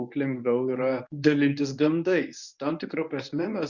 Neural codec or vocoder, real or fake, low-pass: codec, 24 kHz, 0.9 kbps, WavTokenizer, medium speech release version 1; fake; 7.2 kHz